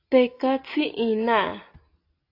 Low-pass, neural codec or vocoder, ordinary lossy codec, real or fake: 5.4 kHz; none; AAC, 32 kbps; real